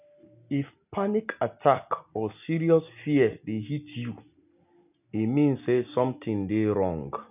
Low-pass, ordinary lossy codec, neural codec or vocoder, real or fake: 3.6 kHz; none; none; real